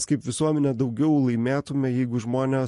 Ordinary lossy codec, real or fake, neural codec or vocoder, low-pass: MP3, 48 kbps; real; none; 14.4 kHz